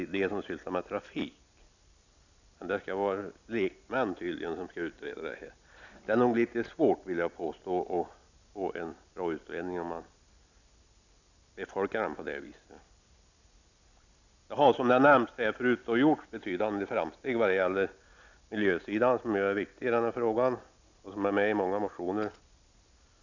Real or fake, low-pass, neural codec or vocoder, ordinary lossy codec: real; 7.2 kHz; none; none